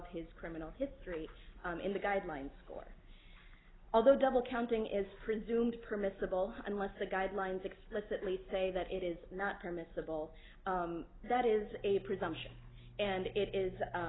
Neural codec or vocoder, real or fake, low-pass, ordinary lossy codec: none; real; 7.2 kHz; AAC, 16 kbps